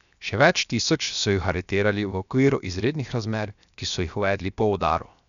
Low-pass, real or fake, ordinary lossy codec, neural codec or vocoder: 7.2 kHz; fake; none; codec, 16 kHz, 0.7 kbps, FocalCodec